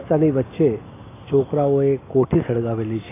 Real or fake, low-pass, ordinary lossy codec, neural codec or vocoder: real; 3.6 kHz; AAC, 16 kbps; none